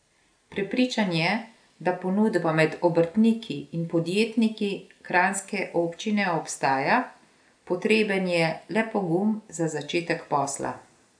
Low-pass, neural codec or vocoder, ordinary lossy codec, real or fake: 9.9 kHz; none; none; real